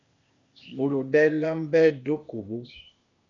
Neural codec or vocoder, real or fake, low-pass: codec, 16 kHz, 0.8 kbps, ZipCodec; fake; 7.2 kHz